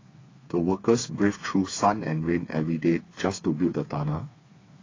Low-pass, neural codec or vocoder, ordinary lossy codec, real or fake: 7.2 kHz; codec, 16 kHz, 4 kbps, FreqCodec, smaller model; AAC, 32 kbps; fake